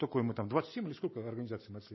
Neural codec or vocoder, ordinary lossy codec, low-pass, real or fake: none; MP3, 24 kbps; 7.2 kHz; real